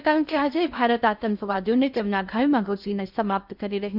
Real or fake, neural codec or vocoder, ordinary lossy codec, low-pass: fake; codec, 16 kHz in and 24 kHz out, 0.6 kbps, FocalCodec, streaming, 2048 codes; none; 5.4 kHz